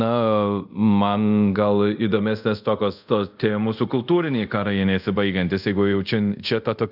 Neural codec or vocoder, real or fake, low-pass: codec, 24 kHz, 0.5 kbps, DualCodec; fake; 5.4 kHz